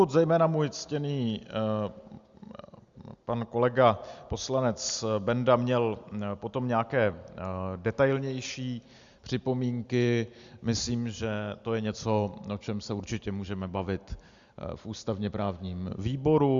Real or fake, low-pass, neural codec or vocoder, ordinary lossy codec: real; 7.2 kHz; none; Opus, 64 kbps